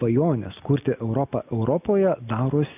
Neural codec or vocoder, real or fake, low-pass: none; real; 3.6 kHz